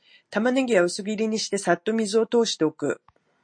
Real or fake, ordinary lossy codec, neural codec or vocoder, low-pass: real; MP3, 48 kbps; none; 9.9 kHz